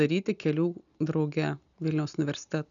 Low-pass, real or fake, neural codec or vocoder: 7.2 kHz; real; none